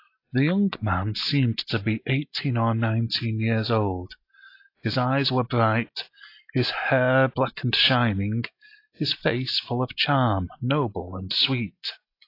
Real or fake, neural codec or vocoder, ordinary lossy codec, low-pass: real; none; AAC, 32 kbps; 5.4 kHz